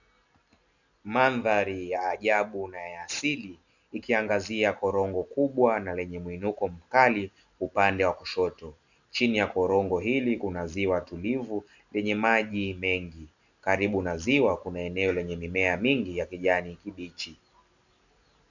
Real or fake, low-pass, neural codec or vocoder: real; 7.2 kHz; none